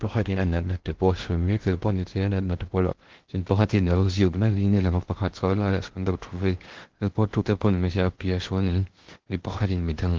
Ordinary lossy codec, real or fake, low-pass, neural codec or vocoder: Opus, 16 kbps; fake; 7.2 kHz; codec, 16 kHz in and 24 kHz out, 0.6 kbps, FocalCodec, streaming, 2048 codes